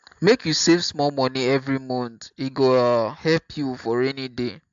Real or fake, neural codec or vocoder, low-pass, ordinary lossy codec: real; none; 7.2 kHz; AAC, 64 kbps